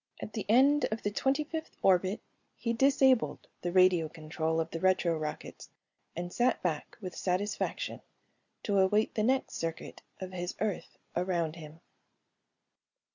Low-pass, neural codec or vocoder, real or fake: 7.2 kHz; none; real